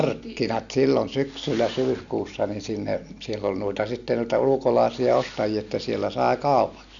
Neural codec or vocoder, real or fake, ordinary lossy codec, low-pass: none; real; none; 7.2 kHz